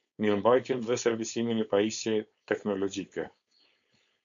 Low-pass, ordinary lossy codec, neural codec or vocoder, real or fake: 7.2 kHz; AAC, 64 kbps; codec, 16 kHz, 4.8 kbps, FACodec; fake